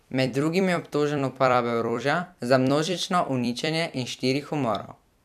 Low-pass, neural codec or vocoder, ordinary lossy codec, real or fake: 14.4 kHz; vocoder, 44.1 kHz, 128 mel bands every 256 samples, BigVGAN v2; none; fake